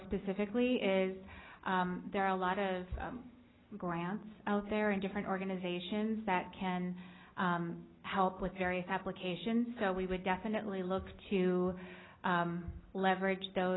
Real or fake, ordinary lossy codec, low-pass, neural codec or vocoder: real; AAC, 16 kbps; 7.2 kHz; none